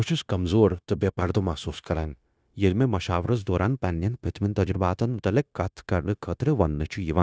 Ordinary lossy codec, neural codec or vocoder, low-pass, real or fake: none; codec, 16 kHz, 0.9 kbps, LongCat-Audio-Codec; none; fake